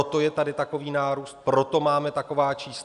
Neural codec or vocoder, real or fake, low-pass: none; real; 10.8 kHz